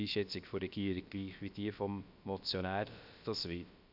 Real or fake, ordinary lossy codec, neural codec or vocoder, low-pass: fake; none; codec, 16 kHz, about 1 kbps, DyCAST, with the encoder's durations; 5.4 kHz